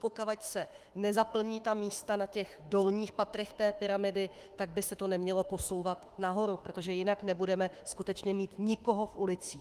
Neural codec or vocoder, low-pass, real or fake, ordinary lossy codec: autoencoder, 48 kHz, 32 numbers a frame, DAC-VAE, trained on Japanese speech; 14.4 kHz; fake; Opus, 24 kbps